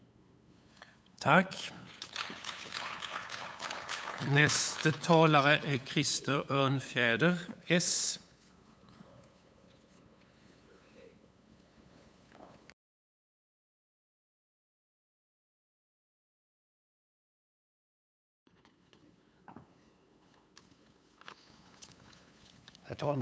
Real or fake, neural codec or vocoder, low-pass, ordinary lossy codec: fake; codec, 16 kHz, 8 kbps, FunCodec, trained on LibriTTS, 25 frames a second; none; none